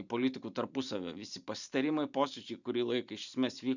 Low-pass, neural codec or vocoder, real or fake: 7.2 kHz; vocoder, 44.1 kHz, 128 mel bands every 512 samples, BigVGAN v2; fake